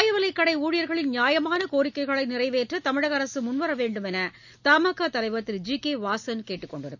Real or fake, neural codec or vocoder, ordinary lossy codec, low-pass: real; none; none; 7.2 kHz